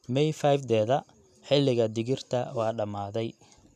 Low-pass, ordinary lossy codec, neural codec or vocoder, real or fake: 14.4 kHz; none; none; real